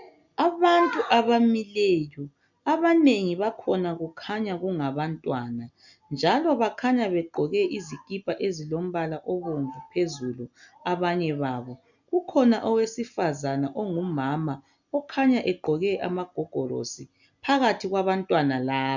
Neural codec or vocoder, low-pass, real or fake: none; 7.2 kHz; real